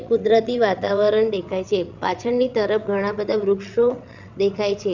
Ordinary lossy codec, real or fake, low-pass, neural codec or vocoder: none; fake; 7.2 kHz; vocoder, 22.05 kHz, 80 mel bands, WaveNeXt